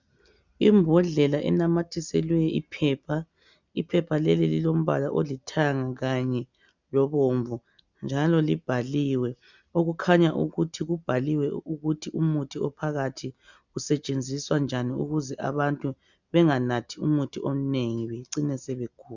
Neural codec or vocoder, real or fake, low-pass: none; real; 7.2 kHz